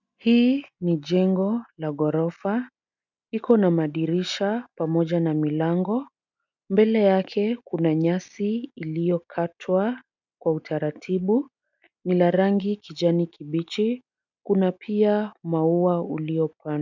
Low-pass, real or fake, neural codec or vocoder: 7.2 kHz; real; none